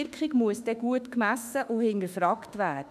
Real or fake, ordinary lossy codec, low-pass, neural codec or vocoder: fake; none; 14.4 kHz; autoencoder, 48 kHz, 32 numbers a frame, DAC-VAE, trained on Japanese speech